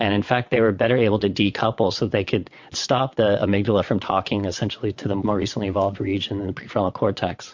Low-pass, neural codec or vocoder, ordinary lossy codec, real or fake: 7.2 kHz; vocoder, 22.05 kHz, 80 mel bands, WaveNeXt; MP3, 48 kbps; fake